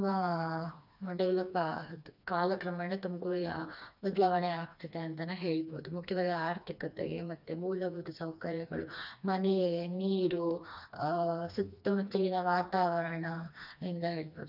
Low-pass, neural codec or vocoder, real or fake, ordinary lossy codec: 5.4 kHz; codec, 16 kHz, 2 kbps, FreqCodec, smaller model; fake; none